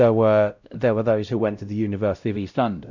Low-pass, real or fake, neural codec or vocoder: 7.2 kHz; fake; codec, 16 kHz, 0.5 kbps, X-Codec, WavLM features, trained on Multilingual LibriSpeech